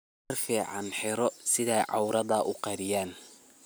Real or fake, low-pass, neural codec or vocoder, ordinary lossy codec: real; none; none; none